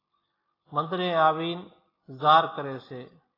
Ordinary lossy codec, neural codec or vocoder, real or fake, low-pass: AAC, 24 kbps; none; real; 5.4 kHz